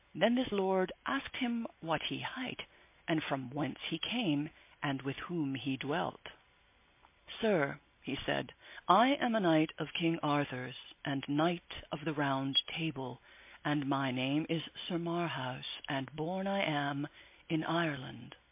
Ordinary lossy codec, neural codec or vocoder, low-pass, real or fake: MP3, 24 kbps; none; 3.6 kHz; real